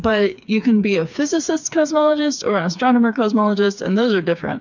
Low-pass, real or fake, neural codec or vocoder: 7.2 kHz; fake; codec, 16 kHz, 4 kbps, FreqCodec, smaller model